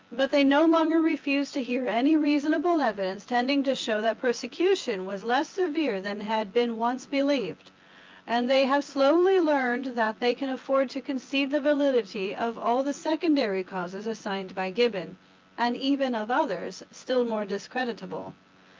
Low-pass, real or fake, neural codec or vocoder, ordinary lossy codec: 7.2 kHz; fake; vocoder, 24 kHz, 100 mel bands, Vocos; Opus, 24 kbps